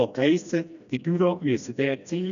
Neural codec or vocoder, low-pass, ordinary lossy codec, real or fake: codec, 16 kHz, 1 kbps, FreqCodec, smaller model; 7.2 kHz; none; fake